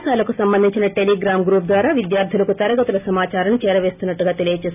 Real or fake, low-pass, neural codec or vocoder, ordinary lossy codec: real; 3.6 kHz; none; none